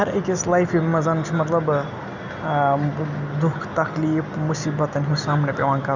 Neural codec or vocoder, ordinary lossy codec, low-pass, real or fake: none; none; 7.2 kHz; real